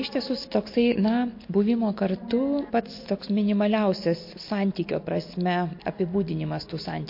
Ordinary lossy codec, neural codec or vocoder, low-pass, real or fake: MP3, 32 kbps; none; 5.4 kHz; real